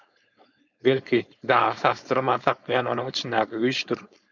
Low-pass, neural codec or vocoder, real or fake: 7.2 kHz; codec, 16 kHz, 4.8 kbps, FACodec; fake